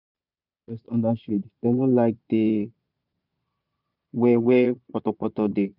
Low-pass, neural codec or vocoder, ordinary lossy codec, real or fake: 5.4 kHz; vocoder, 22.05 kHz, 80 mel bands, Vocos; none; fake